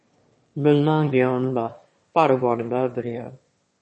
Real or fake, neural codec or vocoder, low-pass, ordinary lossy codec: fake; autoencoder, 22.05 kHz, a latent of 192 numbers a frame, VITS, trained on one speaker; 9.9 kHz; MP3, 32 kbps